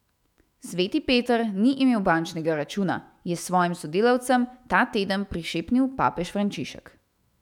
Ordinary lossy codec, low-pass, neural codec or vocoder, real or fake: none; 19.8 kHz; autoencoder, 48 kHz, 128 numbers a frame, DAC-VAE, trained on Japanese speech; fake